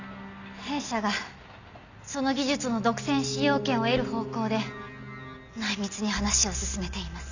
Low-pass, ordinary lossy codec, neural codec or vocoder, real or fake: 7.2 kHz; none; none; real